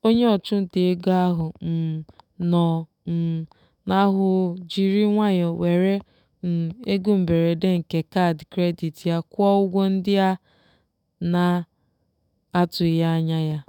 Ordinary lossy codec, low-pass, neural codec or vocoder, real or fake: none; 19.8 kHz; autoencoder, 48 kHz, 128 numbers a frame, DAC-VAE, trained on Japanese speech; fake